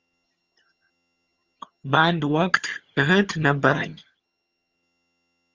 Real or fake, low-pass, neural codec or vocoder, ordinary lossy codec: fake; 7.2 kHz; vocoder, 22.05 kHz, 80 mel bands, HiFi-GAN; Opus, 32 kbps